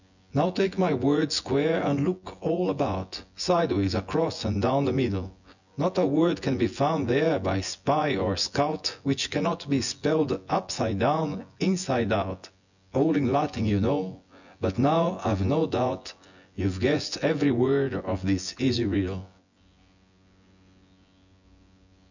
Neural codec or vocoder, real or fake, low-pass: vocoder, 24 kHz, 100 mel bands, Vocos; fake; 7.2 kHz